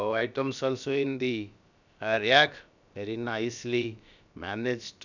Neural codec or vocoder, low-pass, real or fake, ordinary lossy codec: codec, 16 kHz, about 1 kbps, DyCAST, with the encoder's durations; 7.2 kHz; fake; none